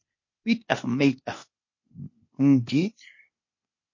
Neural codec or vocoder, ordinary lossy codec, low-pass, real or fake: codec, 16 kHz, 0.8 kbps, ZipCodec; MP3, 32 kbps; 7.2 kHz; fake